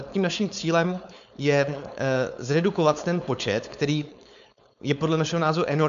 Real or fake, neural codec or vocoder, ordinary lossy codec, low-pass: fake; codec, 16 kHz, 4.8 kbps, FACodec; AAC, 96 kbps; 7.2 kHz